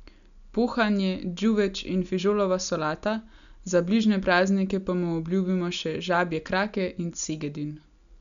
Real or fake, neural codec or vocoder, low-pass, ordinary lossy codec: real; none; 7.2 kHz; none